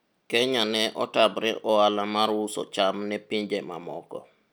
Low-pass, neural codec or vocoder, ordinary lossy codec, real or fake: none; none; none; real